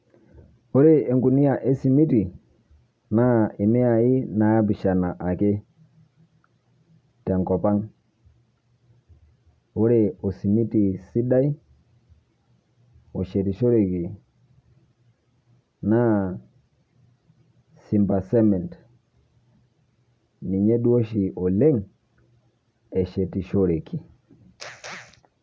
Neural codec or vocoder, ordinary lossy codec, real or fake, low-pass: none; none; real; none